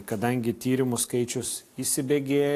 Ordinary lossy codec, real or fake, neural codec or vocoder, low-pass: AAC, 64 kbps; real; none; 14.4 kHz